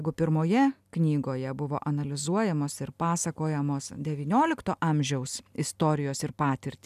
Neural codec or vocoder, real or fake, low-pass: none; real; 14.4 kHz